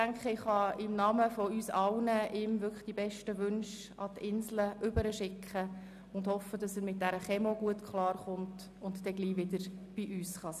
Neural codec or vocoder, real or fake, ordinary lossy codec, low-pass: none; real; none; 14.4 kHz